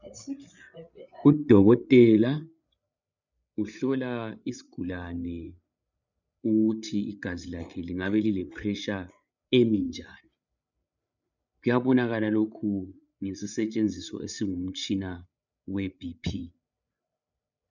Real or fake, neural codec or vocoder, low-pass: fake; codec, 16 kHz, 8 kbps, FreqCodec, larger model; 7.2 kHz